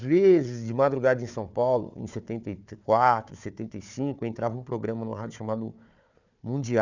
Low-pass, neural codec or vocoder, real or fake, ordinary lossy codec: 7.2 kHz; codec, 16 kHz, 4 kbps, FunCodec, trained on Chinese and English, 50 frames a second; fake; none